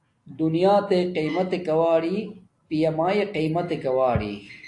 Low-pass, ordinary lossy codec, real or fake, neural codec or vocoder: 10.8 kHz; AAC, 48 kbps; real; none